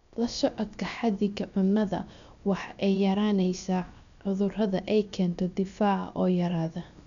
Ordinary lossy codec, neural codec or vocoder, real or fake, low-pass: MP3, 96 kbps; codec, 16 kHz, about 1 kbps, DyCAST, with the encoder's durations; fake; 7.2 kHz